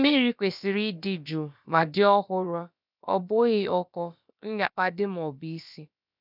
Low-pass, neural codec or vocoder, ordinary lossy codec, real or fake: 5.4 kHz; codec, 16 kHz, about 1 kbps, DyCAST, with the encoder's durations; none; fake